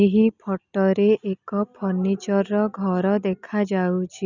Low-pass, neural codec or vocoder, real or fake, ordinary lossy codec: 7.2 kHz; none; real; none